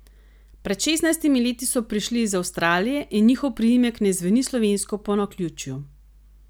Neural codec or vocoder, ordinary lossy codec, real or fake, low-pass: none; none; real; none